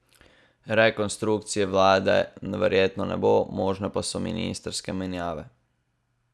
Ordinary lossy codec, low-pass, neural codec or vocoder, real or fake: none; none; none; real